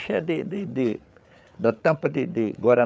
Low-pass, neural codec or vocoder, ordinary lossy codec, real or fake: none; codec, 16 kHz, 8 kbps, FreqCodec, larger model; none; fake